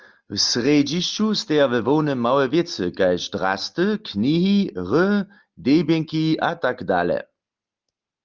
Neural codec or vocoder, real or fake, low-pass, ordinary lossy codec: none; real; 7.2 kHz; Opus, 24 kbps